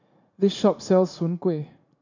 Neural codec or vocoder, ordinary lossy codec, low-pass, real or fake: vocoder, 44.1 kHz, 80 mel bands, Vocos; none; 7.2 kHz; fake